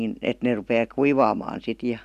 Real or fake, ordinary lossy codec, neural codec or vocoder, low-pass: real; none; none; 14.4 kHz